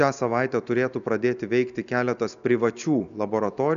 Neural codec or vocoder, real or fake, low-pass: none; real; 7.2 kHz